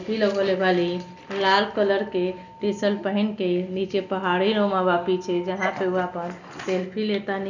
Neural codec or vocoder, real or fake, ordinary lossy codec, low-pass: none; real; none; 7.2 kHz